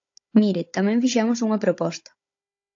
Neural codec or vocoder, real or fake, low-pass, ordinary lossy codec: codec, 16 kHz, 16 kbps, FunCodec, trained on Chinese and English, 50 frames a second; fake; 7.2 kHz; AAC, 48 kbps